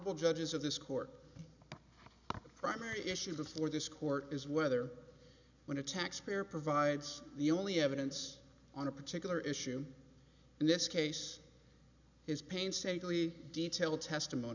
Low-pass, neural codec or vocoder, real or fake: 7.2 kHz; none; real